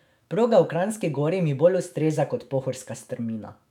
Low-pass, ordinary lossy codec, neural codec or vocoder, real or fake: 19.8 kHz; none; autoencoder, 48 kHz, 128 numbers a frame, DAC-VAE, trained on Japanese speech; fake